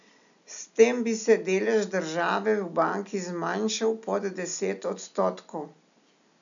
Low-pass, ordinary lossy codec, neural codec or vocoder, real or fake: 7.2 kHz; none; none; real